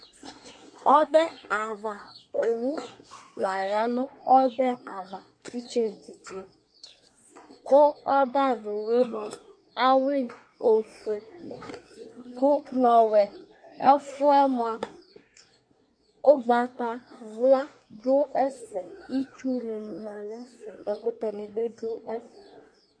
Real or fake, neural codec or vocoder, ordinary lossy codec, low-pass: fake; codec, 24 kHz, 1 kbps, SNAC; MP3, 48 kbps; 9.9 kHz